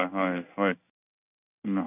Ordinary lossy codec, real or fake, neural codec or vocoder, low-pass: none; fake; codec, 16 kHz in and 24 kHz out, 1 kbps, XY-Tokenizer; 3.6 kHz